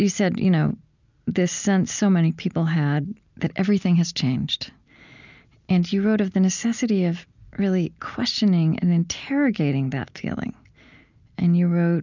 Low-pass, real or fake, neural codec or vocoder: 7.2 kHz; real; none